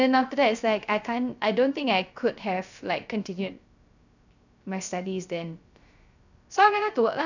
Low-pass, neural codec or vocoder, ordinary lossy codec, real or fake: 7.2 kHz; codec, 16 kHz, 0.3 kbps, FocalCodec; none; fake